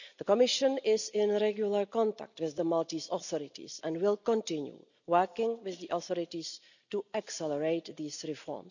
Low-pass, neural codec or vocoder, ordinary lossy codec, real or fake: 7.2 kHz; none; none; real